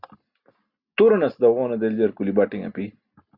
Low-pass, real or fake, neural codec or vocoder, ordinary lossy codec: 5.4 kHz; real; none; Opus, 64 kbps